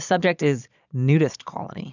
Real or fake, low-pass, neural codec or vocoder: fake; 7.2 kHz; vocoder, 22.05 kHz, 80 mel bands, Vocos